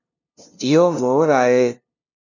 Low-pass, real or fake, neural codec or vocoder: 7.2 kHz; fake; codec, 16 kHz, 0.5 kbps, FunCodec, trained on LibriTTS, 25 frames a second